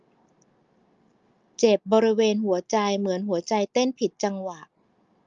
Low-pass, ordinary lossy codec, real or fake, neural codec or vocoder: 7.2 kHz; Opus, 32 kbps; real; none